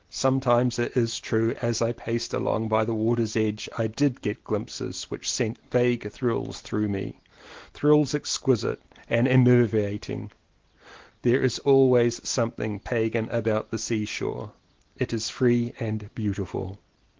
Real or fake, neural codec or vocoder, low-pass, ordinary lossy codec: real; none; 7.2 kHz; Opus, 16 kbps